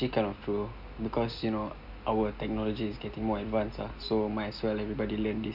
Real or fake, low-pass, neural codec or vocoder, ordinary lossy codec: real; 5.4 kHz; none; none